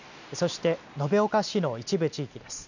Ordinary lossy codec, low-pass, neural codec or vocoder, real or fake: none; 7.2 kHz; none; real